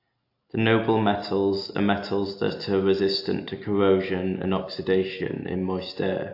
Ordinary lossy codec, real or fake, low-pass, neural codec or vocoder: AAC, 32 kbps; real; 5.4 kHz; none